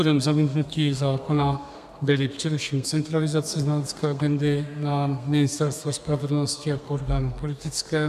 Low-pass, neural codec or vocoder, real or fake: 14.4 kHz; codec, 32 kHz, 1.9 kbps, SNAC; fake